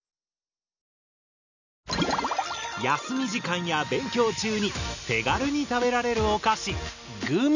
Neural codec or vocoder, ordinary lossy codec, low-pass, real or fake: none; none; 7.2 kHz; real